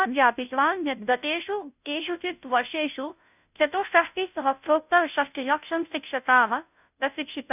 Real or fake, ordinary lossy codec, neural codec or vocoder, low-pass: fake; none; codec, 16 kHz, 0.5 kbps, FunCodec, trained on Chinese and English, 25 frames a second; 3.6 kHz